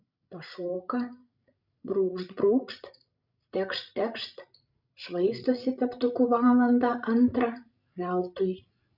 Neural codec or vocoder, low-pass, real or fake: codec, 16 kHz, 16 kbps, FreqCodec, larger model; 5.4 kHz; fake